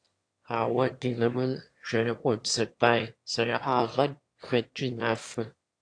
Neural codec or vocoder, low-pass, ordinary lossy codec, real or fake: autoencoder, 22.05 kHz, a latent of 192 numbers a frame, VITS, trained on one speaker; 9.9 kHz; AAC, 48 kbps; fake